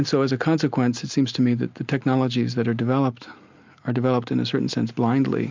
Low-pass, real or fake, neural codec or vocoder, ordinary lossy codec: 7.2 kHz; real; none; MP3, 64 kbps